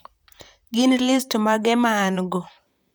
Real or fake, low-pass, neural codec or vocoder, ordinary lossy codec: fake; none; vocoder, 44.1 kHz, 128 mel bands, Pupu-Vocoder; none